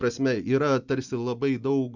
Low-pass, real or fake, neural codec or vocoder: 7.2 kHz; real; none